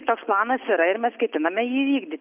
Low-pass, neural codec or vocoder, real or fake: 3.6 kHz; none; real